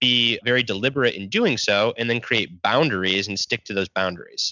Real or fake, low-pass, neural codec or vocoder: real; 7.2 kHz; none